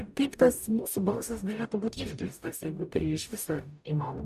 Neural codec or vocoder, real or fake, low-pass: codec, 44.1 kHz, 0.9 kbps, DAC; fake; 14.4 kHz